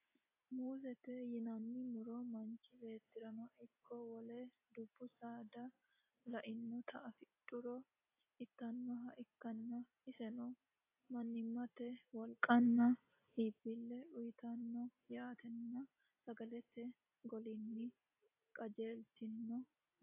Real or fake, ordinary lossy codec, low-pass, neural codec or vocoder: real; AAC, 32 kbps; 3.6 kHz; none